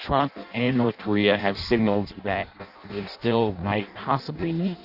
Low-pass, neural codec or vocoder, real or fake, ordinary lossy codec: 5.4 kHz; codec, 16 kHz in and 24 kHz out, 0.6 kbps, FireRedTTS-2 codec; fake; AAC, 48 kbps